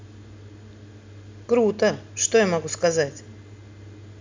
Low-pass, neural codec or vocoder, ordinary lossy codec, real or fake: 7.2 kHz; none; MP3, 64 kbps; real